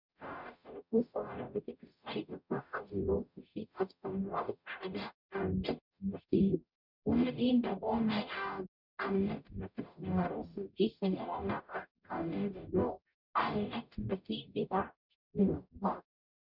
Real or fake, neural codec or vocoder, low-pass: fake; codec, 44.1 kHz, 0.9 kbps, DAC; 5.4 kHz